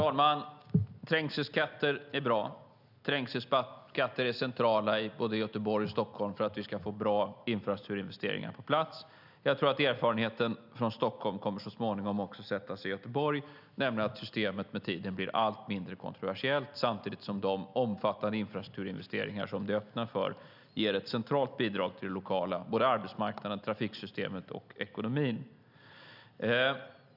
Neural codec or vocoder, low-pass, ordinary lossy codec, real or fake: none; 5.4 kHz; none; real